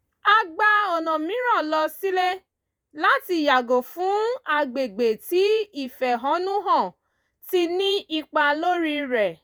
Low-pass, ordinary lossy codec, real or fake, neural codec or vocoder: none; none; fake; vocoder, 48 kHz, 128 mel bands, Vocos